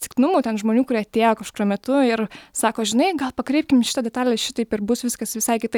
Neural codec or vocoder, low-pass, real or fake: none; 19.8 kHz; real